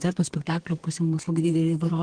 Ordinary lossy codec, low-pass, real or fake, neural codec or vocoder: Opus, 16 kbps; 9.9 kHz; fake; codec, 32 kHz, 1.9 kbps, SNAC